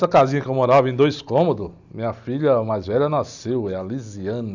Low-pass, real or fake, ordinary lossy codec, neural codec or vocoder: 7.2 kHz; real; none; none